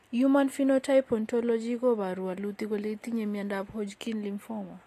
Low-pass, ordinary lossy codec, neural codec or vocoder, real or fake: 14.4 kHz; AAC, 48 kbps; none; real